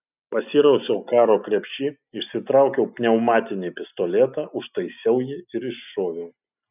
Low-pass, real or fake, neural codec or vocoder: 3.6 kHz; real; none